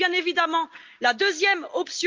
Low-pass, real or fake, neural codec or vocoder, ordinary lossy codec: 7.2 kHz; real; none; Opus, 24 kbps